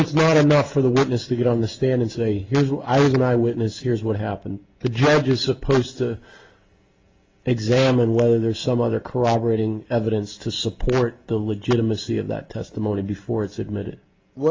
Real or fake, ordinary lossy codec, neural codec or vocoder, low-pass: real; Opus, 32 kbps; none; 7.2 kHz